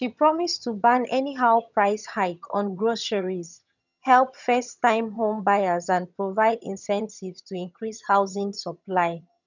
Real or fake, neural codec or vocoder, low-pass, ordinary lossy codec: fake; vocoder, 22.05 kHz, 80 mel bands, HiFi-GAN; 7.2 kHz; none